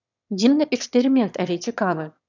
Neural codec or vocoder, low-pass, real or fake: autoencoder, 22.05 kHz, a latent of 192 numbers a frame, VITS, trained on one speaker; 7.2 kHz; fake